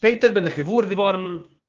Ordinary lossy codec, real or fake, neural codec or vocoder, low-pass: Opus, 24 kbps; fake; codec, 16 kHz, 0.8 kbps, ZipCodec; 7.2 kHz